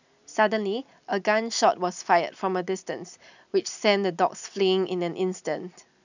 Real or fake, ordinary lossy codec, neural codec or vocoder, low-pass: real; none; none; 7.2 kHz